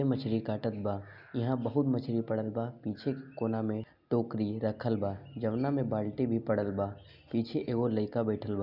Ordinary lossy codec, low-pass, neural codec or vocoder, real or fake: none; 5.4 kHz; none; real